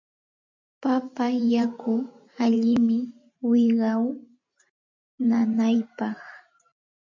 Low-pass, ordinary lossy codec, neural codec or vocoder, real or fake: 7.2 kHz; MP3, 48 kbps; vocoder, 44.1 kHz, 128 mel bands every 512 samples, BigVGAN v2; fake